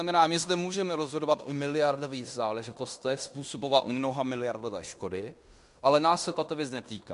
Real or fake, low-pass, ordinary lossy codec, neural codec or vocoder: fake; 10.8 kHz; MP3, 64 kbps; codec, 16 kHz in and 24 kHz out, 0.9 kbps, LongCat-Audio-Codec, fine tuned four codebook decoder